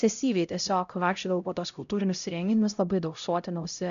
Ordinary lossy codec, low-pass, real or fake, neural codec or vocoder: MP3, 96 kbps; 7.2 kHz; fake; codec, 16 kHz, 0.5 kbps, X-Codec, HuBERT features, trained on LibriSpeech